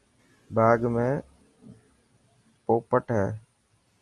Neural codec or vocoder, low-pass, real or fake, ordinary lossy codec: none; 10.8 kHz; real; Opus, 32 kbps